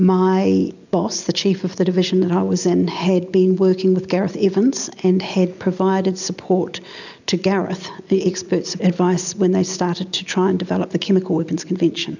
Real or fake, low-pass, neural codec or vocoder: real; 7.2 kHz; none